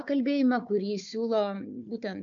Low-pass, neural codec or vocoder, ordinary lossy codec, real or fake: 7.2 kHz; codec, 16 kHz, 4 kbps, FunCodec, trained on Chinese and English, 50 frames a second; AAC, 64 kbps; fake